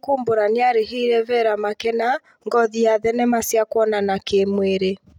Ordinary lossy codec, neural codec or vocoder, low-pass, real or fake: none; none; 19.8 kHz; real